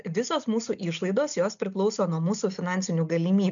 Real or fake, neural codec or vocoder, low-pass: real; none; 7.2 kHz